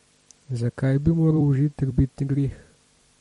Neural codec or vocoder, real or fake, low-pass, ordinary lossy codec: vocoder, 44.1 kHz, 128 mel bands every 256 samples, BigVGAN v2; fake; 19.8 kHz; MP3, 48 kbps